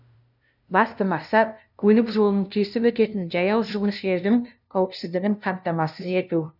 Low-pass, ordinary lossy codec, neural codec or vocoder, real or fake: 5.4 kHz; none; codec, 16 kHz, 0.5 kbps, FunCodec, trained on LibriTTS, 25 frames a second; fake